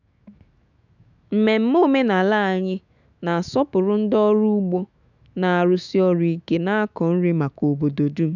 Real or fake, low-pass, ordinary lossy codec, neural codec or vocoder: fake; 7.2 kHz; none; autoencoder, 48 kHz, 128 numbers a frame, DAC-VAE, trained on Japanese speech